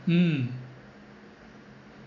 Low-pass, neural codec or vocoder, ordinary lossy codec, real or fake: 7.2 kHz; none; none; real